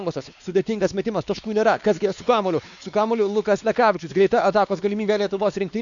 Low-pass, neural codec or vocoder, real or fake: 7.2 kHz; codec, 16 kHz, 2 kbps, X-Codec, WavLM features, trained on Multilingual LibriSpeech; fake